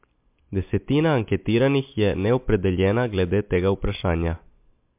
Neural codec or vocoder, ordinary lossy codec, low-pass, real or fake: none; MP3, 32 kbps; 3.6 kHz; real